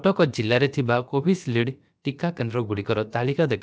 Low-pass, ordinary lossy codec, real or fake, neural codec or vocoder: none; none; fake; codec, 16 kHz, about 1 kbps, DyCAST, with the encoder's durations